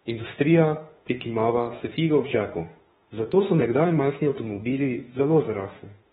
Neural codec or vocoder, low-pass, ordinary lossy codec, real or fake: autoencoder, 48 kHz, 32 numbers a frame, DAC-VAE, trained on Japanese speech; 19.8 kHz; AAC, 16 kbps; fake